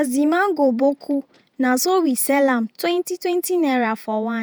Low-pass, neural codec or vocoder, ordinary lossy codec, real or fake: none; vocoder, 48 kHz, 128 mel bands, Vocos; none; fake